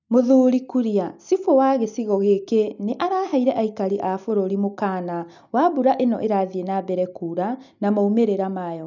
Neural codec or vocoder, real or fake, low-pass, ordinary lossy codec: none; real; 7.2 kHz; none